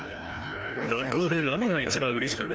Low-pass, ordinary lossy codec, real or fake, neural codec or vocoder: none; none; fake; codec, 16 kHz, 1 kbps, FreqCodec, larger model